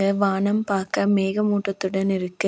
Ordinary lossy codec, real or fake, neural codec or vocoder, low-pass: none; real; none; none